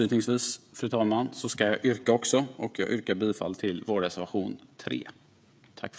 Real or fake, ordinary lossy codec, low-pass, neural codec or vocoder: fake; none; none; codec, 16 kHz, 16 kbps, FreqCodec, smaller model